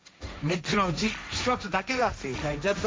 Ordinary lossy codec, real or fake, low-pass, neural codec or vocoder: none; fake; none; codec, 16 kHz, 1.1 kbps, Voila-Tokenizer